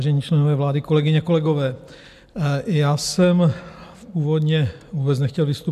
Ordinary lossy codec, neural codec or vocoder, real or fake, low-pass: MP3, 96 kbps; none; real; 14.4 kHz